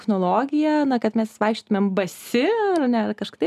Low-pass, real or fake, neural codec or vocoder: 14.4 kHz; real; none